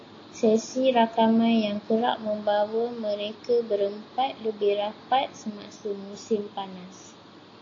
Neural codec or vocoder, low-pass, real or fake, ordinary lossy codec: none; 7.2 kHz; real; AAC, 32 kbps